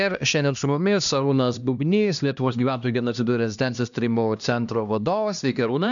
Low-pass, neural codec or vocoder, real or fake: 7.2 kHz; codec, 16 kHz, 1 kbps, X-Codec, HuBERT features, trained on LibriSpeech; fake